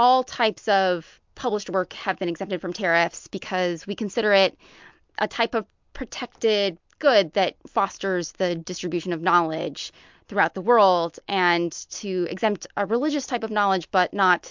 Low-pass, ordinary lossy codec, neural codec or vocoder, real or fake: 7.2 kHz; MP3, 64 kbps; none; real